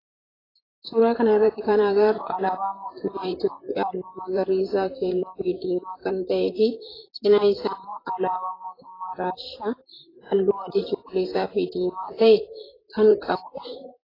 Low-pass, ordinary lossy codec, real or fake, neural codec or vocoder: 5.4 kHz; AAC, 24 kbps; fake; vocoder, 44.1 kHz, 128 mel bands, Pupu-Vocoder